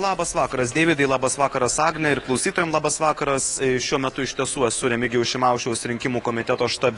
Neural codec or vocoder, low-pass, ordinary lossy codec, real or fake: codec, 24 kHz, 3.1 kbps, DualCodec; 10.8 kHz; AAC, 32 kbps; fake